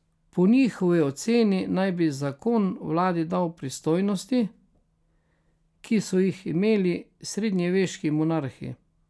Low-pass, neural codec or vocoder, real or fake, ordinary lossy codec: none; none; real; none